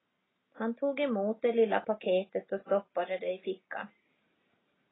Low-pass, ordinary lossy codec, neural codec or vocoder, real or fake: 7.2 kHz; AAC, 16 kbps; none; real